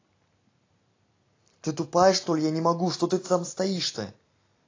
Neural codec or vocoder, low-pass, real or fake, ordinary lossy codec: none; 7.2 kHz; real; AAC, 32 kbps